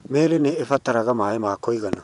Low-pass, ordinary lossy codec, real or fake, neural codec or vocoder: 10.8 kHz; none; fake; vocoder, 24 kHz, 100 mel bands, Vocos